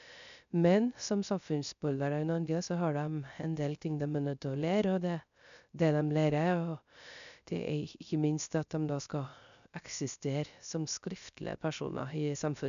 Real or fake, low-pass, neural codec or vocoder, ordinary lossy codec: fake; 7.2 kHz; codec, 16 kHz, 0.3 kbps, FocalCodec; none